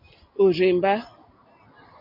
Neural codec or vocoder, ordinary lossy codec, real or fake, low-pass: none; MP3, 32 kbps; real; 5.4 kHz